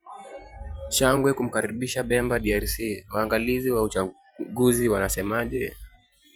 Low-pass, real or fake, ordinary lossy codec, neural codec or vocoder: none; fake; none; vocoder, 44.1 kHz, 128 mel bands every 512 samples, BigVGAN v2